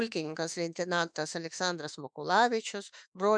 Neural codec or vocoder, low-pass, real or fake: autoencoder, 48 kHz, 32 numbers a frame, DAC-VAE, trained on Japanese speech; 9.9 kHz; fake